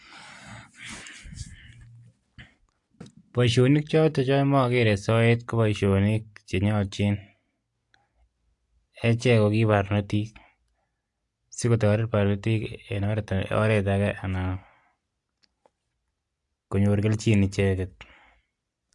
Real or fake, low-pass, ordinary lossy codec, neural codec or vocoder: real; 10.8 kHz; AAC, 64 kbps; none